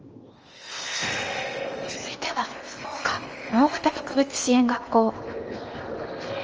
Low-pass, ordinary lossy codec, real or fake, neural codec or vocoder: 7.2 kHz; Opus, 24 kbps; fake; codec, 16 kHz in and 24 kHz out, 0.8 kbps, FocalCodec, streaming, 65536 codes